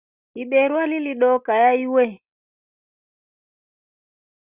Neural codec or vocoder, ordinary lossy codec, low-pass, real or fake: none; Opus, 64 kbps; 3.6 kHz; real